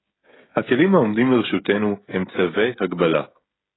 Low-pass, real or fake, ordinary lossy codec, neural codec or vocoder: 7.2 kHz; fake; AAC, 16 kbps; codec, 16 kHz, 16 kbps, FreqCodec, smaller model